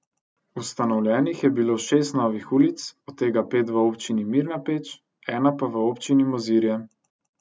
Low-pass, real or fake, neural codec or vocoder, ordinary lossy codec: none; real; none; none